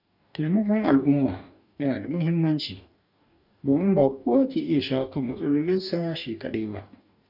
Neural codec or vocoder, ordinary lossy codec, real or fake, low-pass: codec, 44.1 kHz, 2.6 kbps, DAC; none; fake; 5.4 kHz